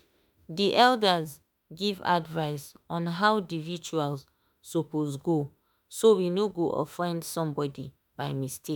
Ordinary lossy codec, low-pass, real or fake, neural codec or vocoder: none; none; fake; autoencoder, 48 kHz, 32 numbers a frame, DAC-VAE, trained on Japanese speech